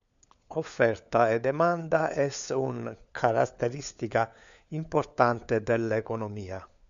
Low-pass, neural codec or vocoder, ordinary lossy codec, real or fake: 7.2 kHz; codec, 16 kHz, 4 kbps, FunCodec, trained on LibriTTS, 50 frames a second; MP3, 96 kbps; fake